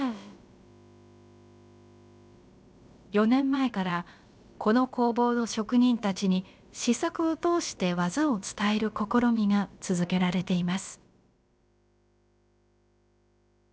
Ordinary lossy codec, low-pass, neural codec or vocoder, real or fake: none; none; codec, 16 kHz, about 1 kbps, DyCAST, with the encoder's durations; fake